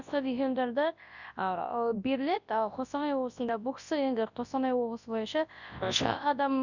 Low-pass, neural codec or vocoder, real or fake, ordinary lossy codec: 7.2 kHz; codec, 24 kHz, 0.9 kbps, WavTokenizer, large speech release; fake; none